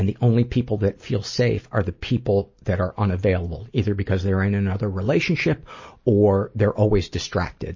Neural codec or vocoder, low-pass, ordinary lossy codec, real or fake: none; 7.2 kHz; MP3, 32 kbps; real